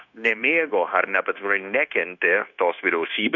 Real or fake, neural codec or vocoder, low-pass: fake; codec, 16 kHz, 0.9 kbps, LongCat-Audio-Codec; 7.2 kHz